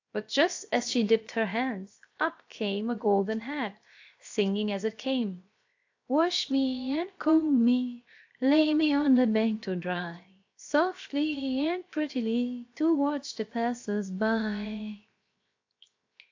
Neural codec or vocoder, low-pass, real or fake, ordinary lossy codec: codec, 16 kHz, 0.7 kbps, FocalCodec; 7.2 kHz; fake; AAC, 48 kbps